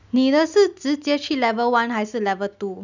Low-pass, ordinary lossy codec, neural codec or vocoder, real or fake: 7.2 kHz; none; none; real